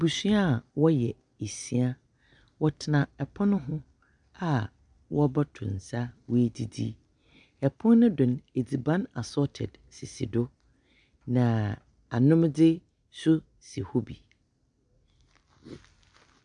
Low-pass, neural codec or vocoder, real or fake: 9.9 kHz; none; real